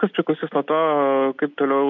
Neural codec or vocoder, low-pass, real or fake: none; 7.2 kHz; real